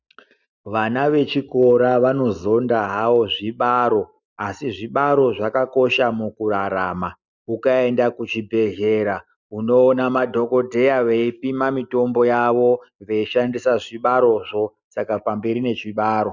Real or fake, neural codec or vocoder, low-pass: real; none; 7.2 kHz